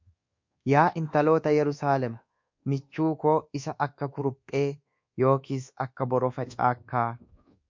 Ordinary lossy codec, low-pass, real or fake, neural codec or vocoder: MP3, 48 kbps; 7.2 kHz; fake; codec, 24 kHz, 1.2 kbps, DualCodec